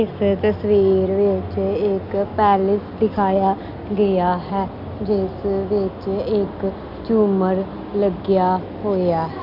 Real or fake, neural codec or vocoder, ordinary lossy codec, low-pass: real; none; none; 5.4 kHz